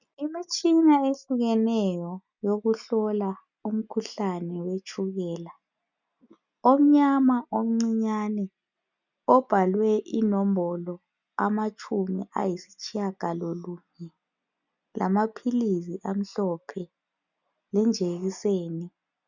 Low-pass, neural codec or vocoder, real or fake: 7.2 kHz; none; real